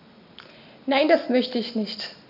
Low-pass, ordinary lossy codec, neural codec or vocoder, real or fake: 5.4 kHz; none; none; real